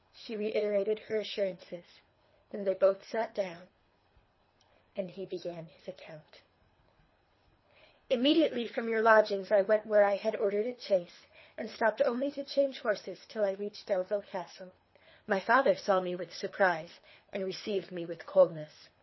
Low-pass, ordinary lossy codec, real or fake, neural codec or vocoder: 7.2 kHz; MP3, 24 kbps; fake; codec, 24 kHz, 3 kbps, HILCodec